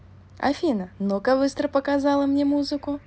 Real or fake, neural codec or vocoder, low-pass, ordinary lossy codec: real; none; none; none